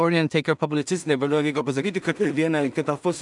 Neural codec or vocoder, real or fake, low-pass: codec, 16 kHz in and 24 kHz out, 0.4 kbps, LongCat-Audio-Codec, two codebook decoder; fake; 10.8 kHz